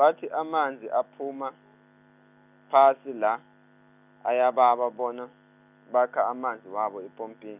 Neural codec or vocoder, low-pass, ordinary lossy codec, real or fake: none; 3.6 kHz; none; real